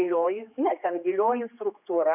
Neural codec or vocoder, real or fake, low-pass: codec, 16 kHz, 2 kbps, X-Codec, HuBERT features, trained on general audio; fake; 3.6 kHz